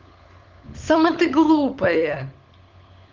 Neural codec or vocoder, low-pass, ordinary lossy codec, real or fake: codec, 16 kHz, 16 kbps, FunCodec, trained on LibriTTS, 50 frames a second; 7.2 kHz; Opus, 24 kbps; fake